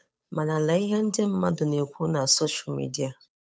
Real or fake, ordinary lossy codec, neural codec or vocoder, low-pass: fake; none; codec, 16 kHz, 8 kbps, FunCodec, trained on Chinese and English, 25 frames a second; none